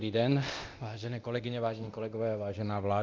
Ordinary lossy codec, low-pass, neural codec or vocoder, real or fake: Opus, 32 kbps; 7.2 kHz; codec, 24 kHz, 0.9 kbps, DualCodec; fake